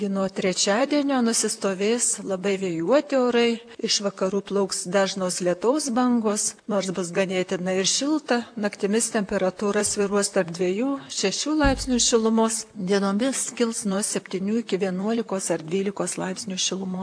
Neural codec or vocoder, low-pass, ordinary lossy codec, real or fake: vocoder, 44.1 kHz, 128 mel bands, Pupu-Vocoder; 9.9 kHz; AAC, 64 kbps; fake